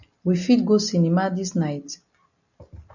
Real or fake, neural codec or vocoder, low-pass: real; none; 7.2 kHz